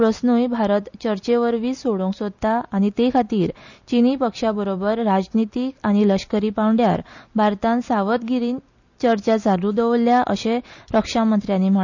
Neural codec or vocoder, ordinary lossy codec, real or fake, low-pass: none; none; real; 7.2 kHz